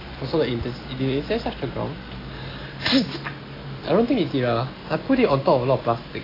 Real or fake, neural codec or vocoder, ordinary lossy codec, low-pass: real; none; AAC, 24 kbps; 5.4 kHz